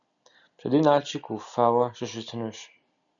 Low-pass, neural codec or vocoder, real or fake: 7.2 kHz; none; real